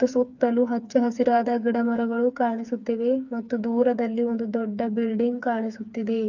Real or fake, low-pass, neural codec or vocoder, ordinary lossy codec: fake; 7.2 kHz; codec, 16 kHz, 4 kbps, FreqCodec, smaller model; none